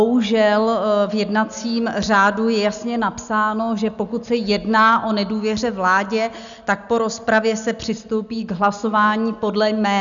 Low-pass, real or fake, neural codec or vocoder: 7.2 kHz; real; none